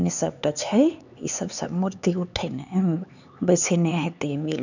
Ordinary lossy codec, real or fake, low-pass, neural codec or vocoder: none; fake; 7.2 kHz; codec, 16 kHz, 4 kbps, X-Codec, HuBERT features, trained on LibriSpeech